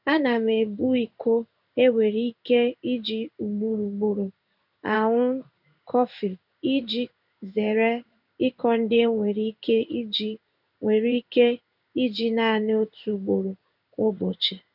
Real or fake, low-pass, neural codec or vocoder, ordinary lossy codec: fake; 5.4 kHz; codec, 16 kHz in and 24 kHz out, 1 kbps, XY-Tokenizer; none